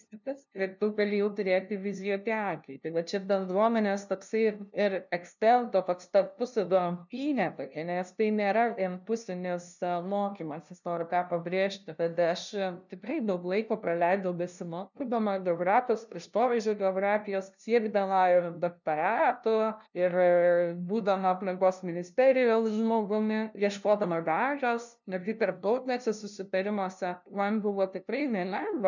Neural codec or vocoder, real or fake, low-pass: codec, 16 kHz, 0.5 kbps, FunCodec, trained on LibriTTS, 25 frames a second; fake; 7.2 kHz